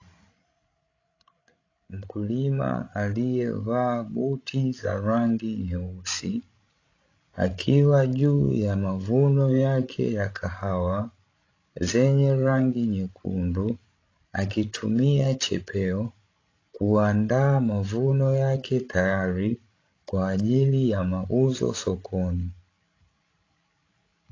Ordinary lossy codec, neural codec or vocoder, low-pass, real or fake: AAC, 32 kbps; codec, 16 kHz, 16 kbps, FreqCodec, larger model; 7.2 kHz; fake